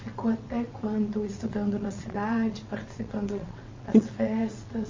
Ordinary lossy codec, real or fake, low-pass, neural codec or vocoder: MP3, 32 kbps; fake; 7.2 kHz; vocoder, 22.05 kHz, 80 mel bands, WaveNeXt